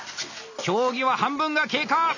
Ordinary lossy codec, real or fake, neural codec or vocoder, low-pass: none; real; none; 7.2 kHz